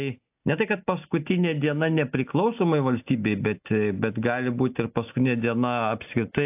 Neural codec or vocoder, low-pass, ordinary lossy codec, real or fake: none; 3.6 kHz; AAC, 32 kbps; real